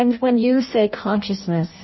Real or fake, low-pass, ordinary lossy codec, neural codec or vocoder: fake; 7.2 kHz; MP3, 24 kbps; codec, 16 kHz in and 24 kHz out, 0.6 kbps, FireRedTTS-2 codec